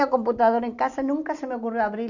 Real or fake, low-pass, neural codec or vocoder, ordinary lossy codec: real; 7.2 kHz; none; none